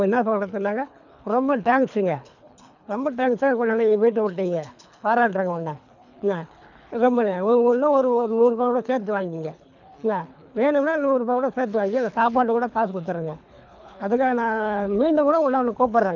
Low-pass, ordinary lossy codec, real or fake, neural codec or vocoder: 7.2 kHz; none; fake; codec, 24 kHz, 3 kbps, HILCodec